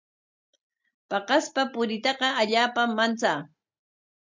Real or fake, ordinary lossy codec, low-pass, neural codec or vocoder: real; MP3, 64 kbps; 7.2 kHz; none